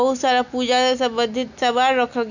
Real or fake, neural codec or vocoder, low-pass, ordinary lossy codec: real; none; 7.2 kHz; none